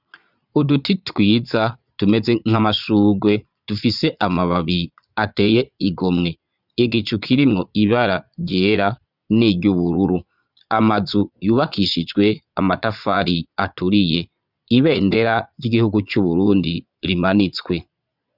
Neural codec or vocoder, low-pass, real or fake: vocoder, 22.05 kHz, 80 mel bands, Vocos; 5.4 kHz; fake